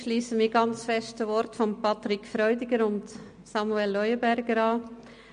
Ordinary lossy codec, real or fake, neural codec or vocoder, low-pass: MP3, 48 kbps; real; none; 9.9 kHz